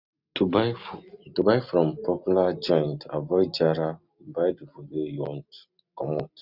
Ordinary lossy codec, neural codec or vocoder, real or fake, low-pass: none; none; real; 5.4 kHz